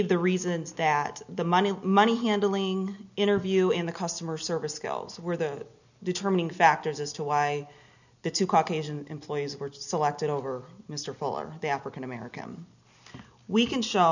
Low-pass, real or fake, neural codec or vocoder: 7.2 kHz; real; none